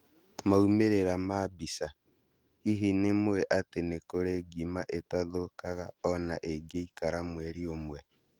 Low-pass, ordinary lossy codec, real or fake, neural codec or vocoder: 19.8 kHz; Opus, 24 kbps; fake; autoencoder, 48 kHz, 128 numbers a frame, DAC-VAE, trained on Japanese speech